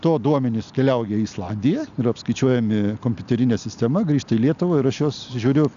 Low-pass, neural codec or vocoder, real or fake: 7.2 kHz; none; real